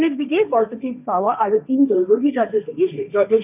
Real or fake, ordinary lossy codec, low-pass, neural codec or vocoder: fake; none; 3.6 kHz; codec, 16 kHz, 1.1 kbps, Voila-Tokenizer